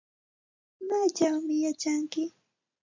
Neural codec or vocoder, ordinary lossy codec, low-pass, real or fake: none; MP3, 48 kbps; 7.2 kHz; real